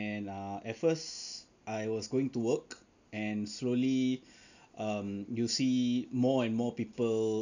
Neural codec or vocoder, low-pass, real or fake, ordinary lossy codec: none; 7.2 kHz; real; none